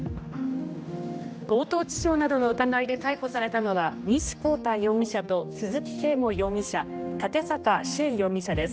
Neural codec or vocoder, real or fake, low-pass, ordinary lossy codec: codec, 16 kHz, 1 kbps, X-Codec, HuBERT features, trained on general audio; fake; none; none